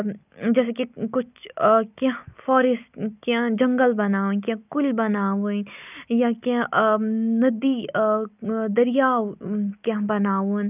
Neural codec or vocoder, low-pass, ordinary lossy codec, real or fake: none; 3.6 kHz; none; real